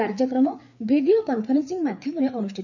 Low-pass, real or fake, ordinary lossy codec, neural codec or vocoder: 7.2 kHz; fake; none; codec, 16 kHz, 4 kbps, FreqCodec, larger model